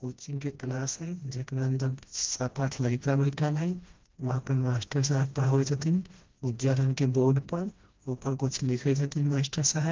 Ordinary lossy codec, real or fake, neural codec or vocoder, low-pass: Opus, 24 kbps; fake; codec, 16 kHz, 1 kbps, FreqCodec, smaller model; 7.2 kHz